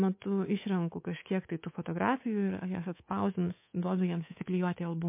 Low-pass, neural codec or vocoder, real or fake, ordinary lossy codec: 3.6 kHz; codec, 24 kHz, 3.1 kbps, DualCodec; fake; MP3, 24 kbps